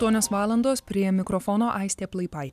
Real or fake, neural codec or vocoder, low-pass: real; none; 14.4 kHz